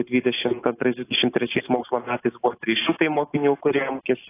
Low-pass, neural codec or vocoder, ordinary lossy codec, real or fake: 3.6 kHz; none; AAC, 24 kbps; real